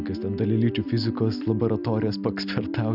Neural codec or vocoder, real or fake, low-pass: none; real; 5.4 kHz